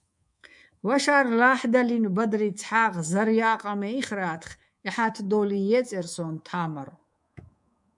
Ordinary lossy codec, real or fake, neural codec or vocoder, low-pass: MP3, 96 kbps; fake; codec, 24 kHz, 3.1 kbps, DualCodec; 10.8 kHz